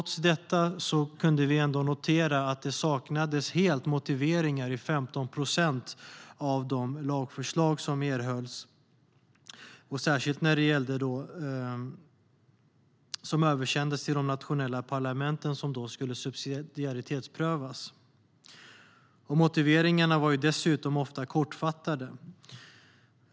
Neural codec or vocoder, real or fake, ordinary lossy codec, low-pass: none; real; none; none